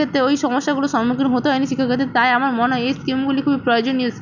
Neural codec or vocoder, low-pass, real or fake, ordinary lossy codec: none; 7.2 kHz; real; none